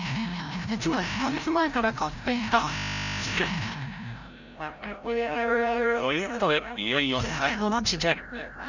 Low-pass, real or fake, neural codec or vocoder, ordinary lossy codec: 7.2 kHz; fake; codec, 16 kHz, 0.5 kbps, FreqCodec, larger model; none